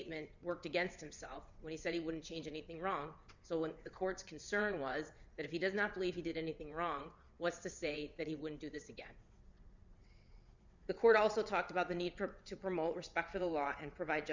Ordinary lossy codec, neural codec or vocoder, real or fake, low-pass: Opus, 64 kbps; vocoder, 22.05 kHz, 80 mel bands, WaveNeXt; fake; 7.2 kHz